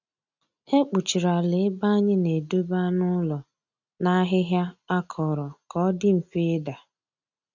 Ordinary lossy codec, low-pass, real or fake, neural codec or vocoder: none; 7.2 kHz; real; none